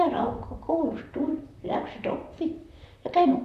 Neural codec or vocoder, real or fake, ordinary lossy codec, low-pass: vocoder, 44.1 kHz, 128 mel bands, Pupu-Vocoder; fake; none; 14.4 kHz